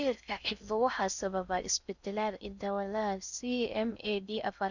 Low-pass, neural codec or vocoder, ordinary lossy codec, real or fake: 7.2 kHz; codec, 16 kHz in and 24 kHz out, 0.6 kbps, FocalCodec, streaming, 4096 codes; none; fake